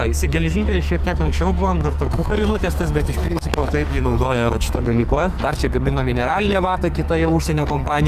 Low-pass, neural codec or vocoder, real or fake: 14.4 kHz; codec, 44.1 kHz, 2.6 kbps, SNAC; fake